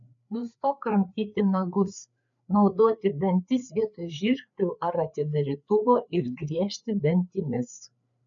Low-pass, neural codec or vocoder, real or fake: 7.2 kHz; codec, 16 kHz, 4 kbps, FreqCodec, larger model; fake